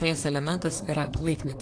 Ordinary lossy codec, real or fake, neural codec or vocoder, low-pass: AAC, 48 kbps; fake; codec, 24 kHz, 1 kbps, SNAC; 9.9 kHz